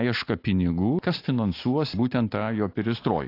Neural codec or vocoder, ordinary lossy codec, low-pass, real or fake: none; AAC, 32 kbps; 5.4 kHz; real